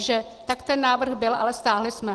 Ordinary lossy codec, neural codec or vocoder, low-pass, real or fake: Opus, 16 kbps; none; 10.8 kHz; real